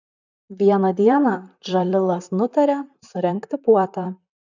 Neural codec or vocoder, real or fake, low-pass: vocoder, 44.1 kHz, 128 mel bands, Pupu-Vocoder; fake; 7.2 kHz